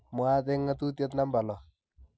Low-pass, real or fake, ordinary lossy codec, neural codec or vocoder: none; real; none; none